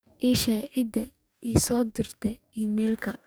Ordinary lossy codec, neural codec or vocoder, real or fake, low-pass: none; codec, 44.1 kHz, 2.6 kbps, DAC; fake; none